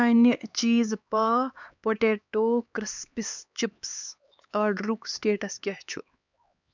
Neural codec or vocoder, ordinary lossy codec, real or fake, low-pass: codec, 16 kHz, 4 kbps, X-Codec, HuBERT features, trained on LibriSpeech; none; fake; 7.2 kHz